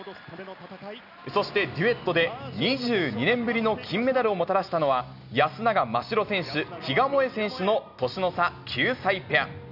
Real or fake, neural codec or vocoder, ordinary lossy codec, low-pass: real; none; none; 5.4 kHz